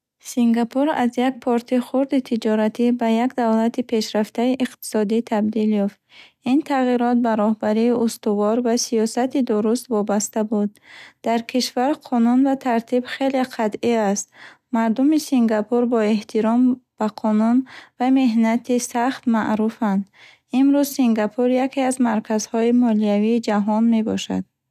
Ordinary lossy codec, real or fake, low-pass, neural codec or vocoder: none; real; 14.4 kHz; none